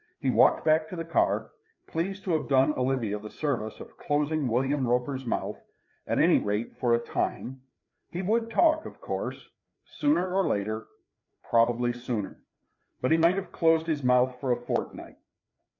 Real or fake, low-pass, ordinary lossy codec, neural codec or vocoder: fake; 7.2 kHz; MP3, 48 kbps; codec, 16 kHz, 4 kbps, FreqCodec, larger model